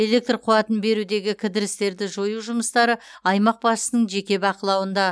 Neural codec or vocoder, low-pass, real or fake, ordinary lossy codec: none; none; real; none